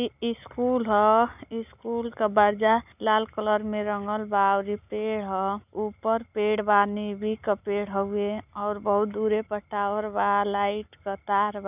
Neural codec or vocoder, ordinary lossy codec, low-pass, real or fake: none; none; 3.6 kHz; real